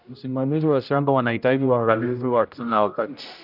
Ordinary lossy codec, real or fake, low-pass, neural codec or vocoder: none; fake; 5.4 kHz; codec, 16 kHz, 0.5 kbps, X-Codec, HuBERT features, trained on general audio